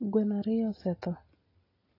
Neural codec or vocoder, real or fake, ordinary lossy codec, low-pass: none; real; AAC, 24 kbps; 5.4 kHz